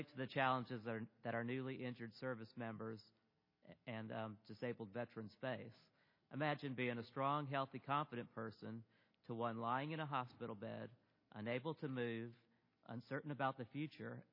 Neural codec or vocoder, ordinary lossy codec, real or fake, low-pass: none; MP3, 24 kbps; real; 5.4 kHz